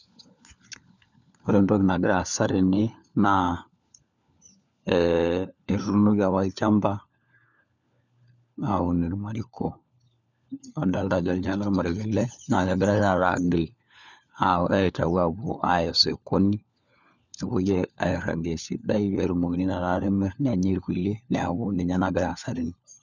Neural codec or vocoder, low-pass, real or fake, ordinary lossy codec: codec, 16 kHz, 4 kbps, FunCodec, trained on LibriTTS, 50 frames a second; 7.2 kHz; fake; none